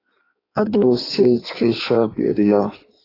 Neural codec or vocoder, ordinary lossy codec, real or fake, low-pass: codec, 16 kHz in and 24 kHz out, 1.1 kbps, FireRedTTS-2 codec; AAC, 24 kbps; fake; 5.4 kHz